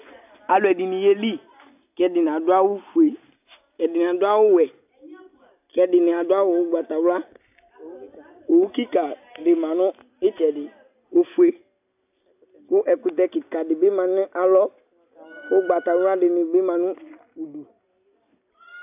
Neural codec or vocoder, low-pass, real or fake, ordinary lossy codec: none; 3.6 kHz; real; AAC, 32 kbps